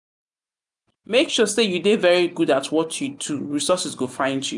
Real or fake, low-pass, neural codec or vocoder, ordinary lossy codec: real; 10.8 kHz; none; MP3, 96 kbps